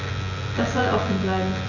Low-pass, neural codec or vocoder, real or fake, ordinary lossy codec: 7.2 kHz; vocoder, 24 kHz, 100 mel bands, Vocos; fake; none